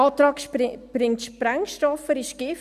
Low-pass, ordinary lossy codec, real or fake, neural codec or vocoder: 14.4 kHz; none; real; none